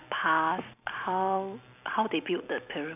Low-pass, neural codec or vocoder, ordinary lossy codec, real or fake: 3.6 kHz; vocoder, 44.1 kHz, 128 mel bands every 256 samples, BigVGAN v2; none; fake